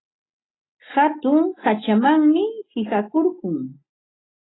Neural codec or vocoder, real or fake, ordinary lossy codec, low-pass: none; real; AAC, 16 kbps; 7.2 kHz